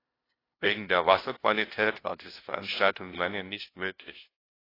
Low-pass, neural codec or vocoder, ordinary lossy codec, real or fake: 5.4 kHz; codec, 16 kHz, 0.5 kbps, FunCodec, trained on LibriTTS, 25 frames a second; AAC, 24 kbps; fake